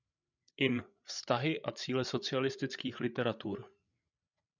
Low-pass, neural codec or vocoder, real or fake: 7.2 kHz; codec, 16 kHz, 8 kbps, FreqCodec, larger model; fake